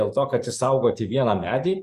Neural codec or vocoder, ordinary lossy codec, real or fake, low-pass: codec, 44.1 kHz, 7.8 kbps, DAC; Opus, 64 kbps; fake; 14.4 kHz